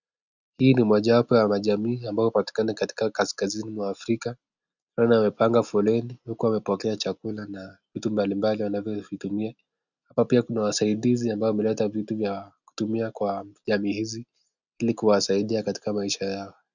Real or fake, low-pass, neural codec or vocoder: real; 7.2 kHz; none